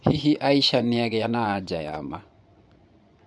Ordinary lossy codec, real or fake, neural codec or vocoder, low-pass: none; fake; vocoder, 24 kHz, 100 mel bands, Vocos; 10.8 kHz